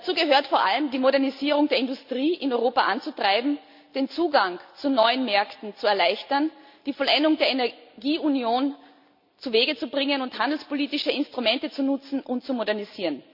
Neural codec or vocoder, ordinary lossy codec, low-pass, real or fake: none; MP3, 48 kbps; 5.4 kHz; real